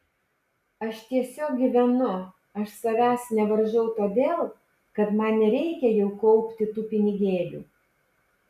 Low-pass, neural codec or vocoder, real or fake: 14.4 kHz; none; real